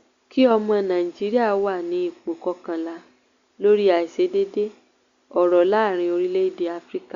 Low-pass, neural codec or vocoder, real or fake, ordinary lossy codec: 7.2 kHz; none; real; Opus, 64 kbps